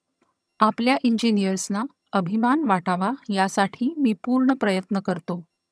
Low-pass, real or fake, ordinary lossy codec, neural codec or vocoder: none; fake; none; vocoder, 22.05 kHz, 80 mel bands, HiFi-GAN